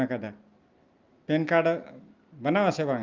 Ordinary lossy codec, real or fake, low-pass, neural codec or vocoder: Opus, 32 kbps; real; 7.2 kHz; none